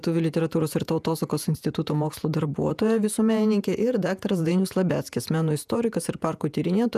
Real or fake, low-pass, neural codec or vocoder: fake; 14.4 kHz; vocoder, 48 kHz, 128 mel bands, Vocos